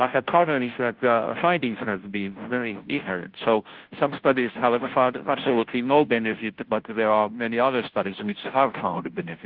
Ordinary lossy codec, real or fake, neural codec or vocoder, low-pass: Opus, 16 kbps; fake; codec, 16 kHz, 0.5 kbps, FunCodec, trained on Chinese and English, 25 frames a second; 5.4 kHz